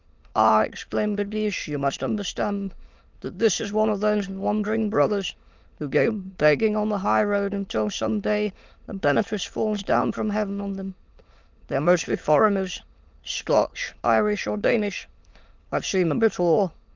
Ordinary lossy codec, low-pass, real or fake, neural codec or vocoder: Opus, 24 kbps; 7.2 kHz; fake; autoencoder, 22.05 kHz, a latent of 192 numbers a frame, VITS, trained on many speakers